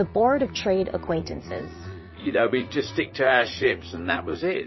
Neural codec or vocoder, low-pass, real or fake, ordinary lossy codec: vocoder, 44.1 kHz, 80 mel bands, Vocos; 7.2 kHz; fake; MP3, 24 kbps